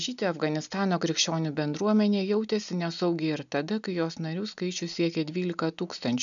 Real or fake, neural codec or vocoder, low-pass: real; none; 7.2 kHz